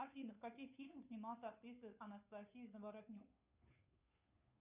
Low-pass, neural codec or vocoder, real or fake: 3.6 kHz; codec, 16 kHz, 2 kbps, FunCodec, trained on Chinese and English, 25 frames a second; fake